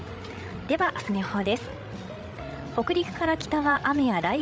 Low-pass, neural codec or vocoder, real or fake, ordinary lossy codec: none; codec, 16 kHz, 16 kbps, FreqCodec, larger model; fake; none